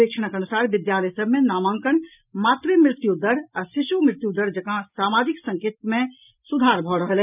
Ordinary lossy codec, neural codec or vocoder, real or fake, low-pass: none; none; real; 3.6 kHz